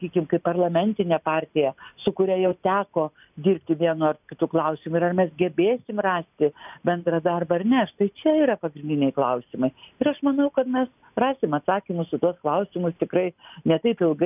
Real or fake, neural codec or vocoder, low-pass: real; none; 3.6 kHz